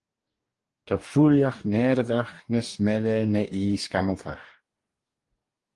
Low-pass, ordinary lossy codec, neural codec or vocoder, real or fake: 10.8 kHz; Opus, 24 kbps; codec, 44.1 kHz, 2.6 kbps, DAC; fake